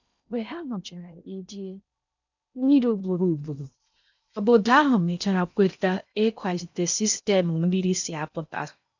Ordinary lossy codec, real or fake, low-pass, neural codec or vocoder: none; fake; 7.2 kHz; codec, 16 kHz in and 24 kHz out, 0.6 kbps, FocalCodec, streaming, 4096 codes